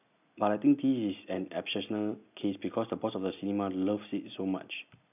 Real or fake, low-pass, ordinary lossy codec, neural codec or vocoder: real; 3.6 kHz; none; none